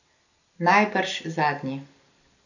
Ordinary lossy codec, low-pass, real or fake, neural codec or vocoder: none; 7.2 kHz; real; none